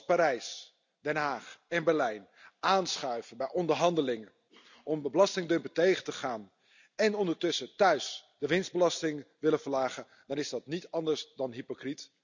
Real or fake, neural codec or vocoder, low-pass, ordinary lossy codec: real; none; 7.2 kHz; none